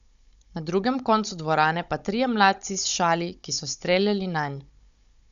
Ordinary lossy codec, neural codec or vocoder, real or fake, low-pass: none; codec, 16 kHz, 16 kbps, FunCodec, trained on Chinese and English, 50 frames a second; fake; 7.2 kHz